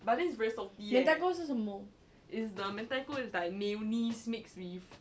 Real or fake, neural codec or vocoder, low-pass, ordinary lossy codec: real; none; none; none